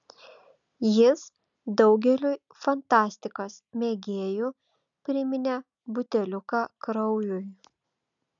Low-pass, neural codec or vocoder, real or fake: 7.2 kHz; none; real